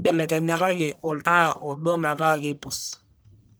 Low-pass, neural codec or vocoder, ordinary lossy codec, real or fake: none; codec, 44.1 kHz, 1.7 kbps, Pupu-Codec; none; fake